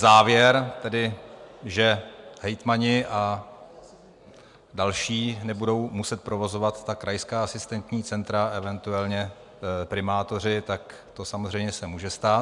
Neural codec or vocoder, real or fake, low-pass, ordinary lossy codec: none; real; 10.8 kHz; MP3, 96 kbps